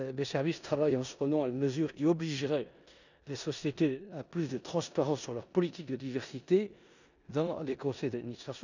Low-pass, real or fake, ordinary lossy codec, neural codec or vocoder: 7.2 kHz; fake; none; codec, 16 kHz in and 24 kHz out, 0.9 kbps, LongCat-Audio-Codec, four codebook decoder